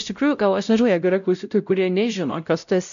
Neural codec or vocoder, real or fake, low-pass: codec, 16 kHz, 0.5 kbps, X-Codec, WavLM features, trained on Multilingual LibriSpeech; fake; 7.2 kHz